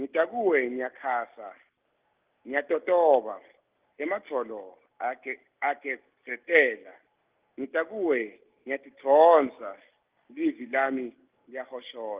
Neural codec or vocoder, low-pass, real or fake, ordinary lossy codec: none; 3.6 kHz; real; Opus, 32 kbps